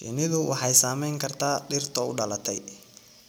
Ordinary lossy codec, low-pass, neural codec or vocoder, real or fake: none; none; none; real